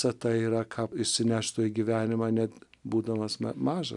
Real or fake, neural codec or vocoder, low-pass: real; none; 10.8 kHz